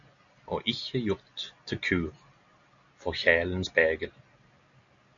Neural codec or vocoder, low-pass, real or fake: none; 7.2 kHz; real